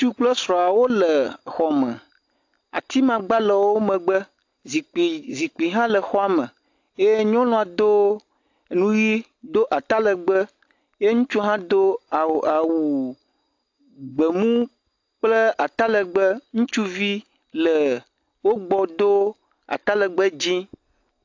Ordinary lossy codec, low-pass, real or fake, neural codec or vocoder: AAC, 48 kbps; 7.2 kHz; real; none